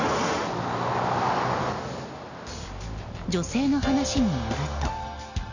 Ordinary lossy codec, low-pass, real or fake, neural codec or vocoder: none; 7.2 kHz; real; none